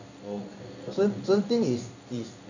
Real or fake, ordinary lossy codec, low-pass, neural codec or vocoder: fake; none; 7.2 kHz; codec, 16 kHz in and 24 kHz out, 1 kbps, XY-Tokenizer